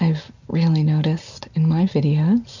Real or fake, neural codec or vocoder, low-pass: real; none; 7.2 kHz